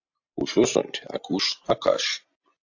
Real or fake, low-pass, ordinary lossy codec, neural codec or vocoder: real; 7.2 kHz; AAC, 48 kbps; none